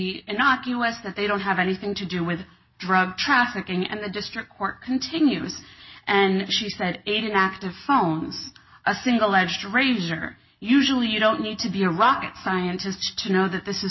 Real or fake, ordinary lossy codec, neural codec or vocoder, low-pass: real; MP3, 24 kbps; none; 7.2 kHz